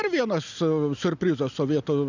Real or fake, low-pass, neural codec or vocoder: real; 7.2 kHz; none